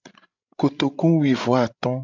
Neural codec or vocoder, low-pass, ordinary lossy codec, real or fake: codec, 16 kHz, 16 kbps, FreqCodec, larger model; 7.2 kHz; MP3, 64 kbps; fake